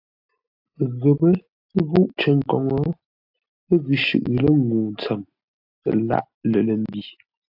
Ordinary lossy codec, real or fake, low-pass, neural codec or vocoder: AAC, 48 kbps; real; 5.4 kHz; none